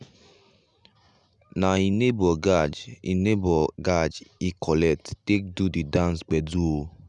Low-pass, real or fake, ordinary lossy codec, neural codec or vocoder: 10.8 kHz; real; none; none